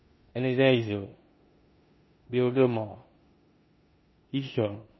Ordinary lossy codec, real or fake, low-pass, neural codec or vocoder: MP3, 24 kbps; fake; 7.2 kHz; codec, 16 kHz in and 24 kHz out, 0.9 kbps, LongCat-Audio-Codec, four codebook decoder